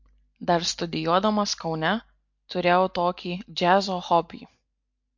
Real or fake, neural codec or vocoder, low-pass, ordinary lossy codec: real; none; 7.2 kHz; MP3, 48 kbps